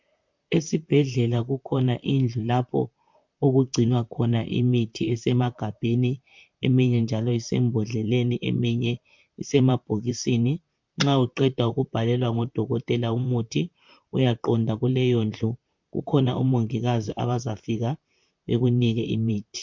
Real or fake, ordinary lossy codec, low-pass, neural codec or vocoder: fake; MP3, 64 kbps; 7.2 kHz; vocoder, 44.1 kHz, 128 mel bands, Pupu-Vocoder